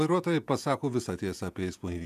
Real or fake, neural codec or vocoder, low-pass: fake; vocoder, 48 kHz, 128 mel bands, Vocos; 14.4 kHz